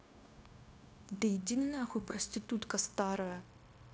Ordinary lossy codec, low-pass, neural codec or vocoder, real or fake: none; none; codec, 16 kHz, 0.9 kbps, LongCat-Audio-Codec; fake